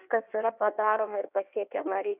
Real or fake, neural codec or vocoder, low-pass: fake; codec, 16 kHz in and 24 kHz out, 1.1 kbps, FireRedTTS-2 codec; 3.6 kHz